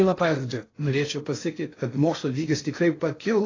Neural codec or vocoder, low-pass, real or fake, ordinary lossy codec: codec, 16 kHz in and 24 kHz out, 0.6 kbps, FocalCodec, streaming, 4096 codes; 7.2 kHz; fake; AAC, 32 kbps